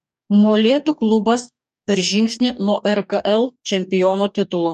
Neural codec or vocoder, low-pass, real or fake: codec, 44.1 kHz, 2.6 kbps, DAC; 14.4 kHz; fake